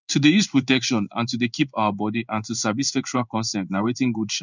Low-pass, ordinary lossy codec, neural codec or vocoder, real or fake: 7.2 kHz; none; codec, 16 kHz in and 24 kHz out, 1 kbps, XY-Tokenizer; fake